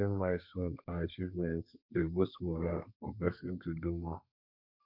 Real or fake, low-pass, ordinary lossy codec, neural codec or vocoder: fake; 5.4 kHz; none; codec, 32 kHz, 1.9 kbps, SNAC